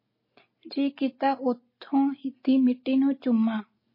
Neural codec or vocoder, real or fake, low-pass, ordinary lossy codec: none; real; 5.4 kHz; MP3, 24 kbps